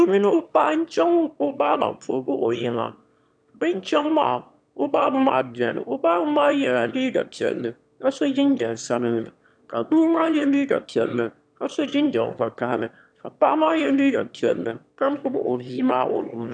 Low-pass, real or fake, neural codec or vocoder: 9.9 kHz; fake; autoencoder, 22.05 kHz, a latent of 192 numbers a frame, VITS, trained on one speaker